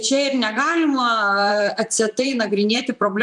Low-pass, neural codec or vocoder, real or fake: 10.8 kHz; vocoder, 44.1 kHz, 128 mel bands, Pupu-Vocoder; fake